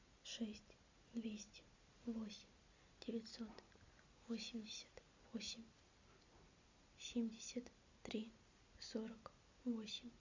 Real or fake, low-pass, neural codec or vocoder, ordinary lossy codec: real; 7.2 kHz; none; MP3, 64 kbps